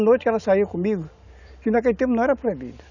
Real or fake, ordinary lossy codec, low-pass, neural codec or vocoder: real; none; 7.2 kHz; none